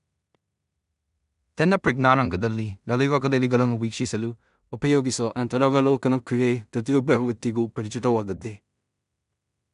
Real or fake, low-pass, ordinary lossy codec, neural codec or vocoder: fake; 10.8 kHz; none; codec, 16 kHz in and 24 kHz out, 0.4 kbps, LongCat-Audio-Codec, two codebook decoder